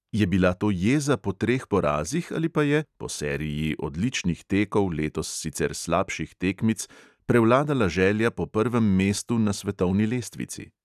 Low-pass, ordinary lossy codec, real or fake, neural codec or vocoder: 14.4 kHz; none; real; none